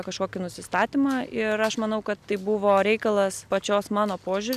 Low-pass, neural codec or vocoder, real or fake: 14.4 kHz; none; real